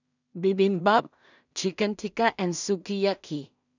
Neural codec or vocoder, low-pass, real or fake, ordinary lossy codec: codec, 16 kHz in and 24 kHz out, 0.4 kbps, LongCat-Audio-Codec, two codebook decoder; 7.2 kHz; fake; none